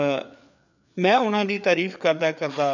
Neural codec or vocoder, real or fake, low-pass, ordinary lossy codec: codec, 16 kHz, 4 kbps, FreqCodec, larger model; fake; 7.2 kHz; none